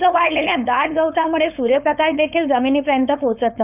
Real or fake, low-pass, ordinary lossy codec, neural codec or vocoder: fake; 3.6 kHz; none; codec, 16 kHz, 4.8 kbps, FACodec